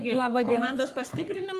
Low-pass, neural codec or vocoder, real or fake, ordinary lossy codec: 14.4 kHz; codec, 44.1 kHz, 3.4 kbps, Pupu-Codec; fake; Opus, 32 kbps